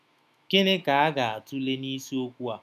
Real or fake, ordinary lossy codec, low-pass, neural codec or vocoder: fake; none; 14.4 kHz; autoencoder, 48 kHz, 128 numbers a frame, DAC-VAE, trained on Japanese speech